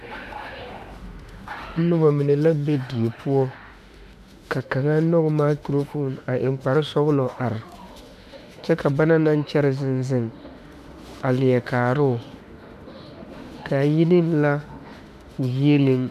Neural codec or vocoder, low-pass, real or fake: autoencoder, 48 kHz, 32 numbers a frame, DAC-VAE, trained on Japanese speech; 14.4 kHz; fake